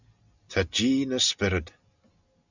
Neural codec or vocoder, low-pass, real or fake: none; 7.2 kHz; real